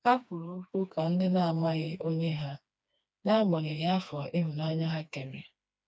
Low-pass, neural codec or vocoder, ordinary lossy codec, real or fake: none; codec, 16 kHz, 2 kbps, FreqCodec, smaller model; none; fake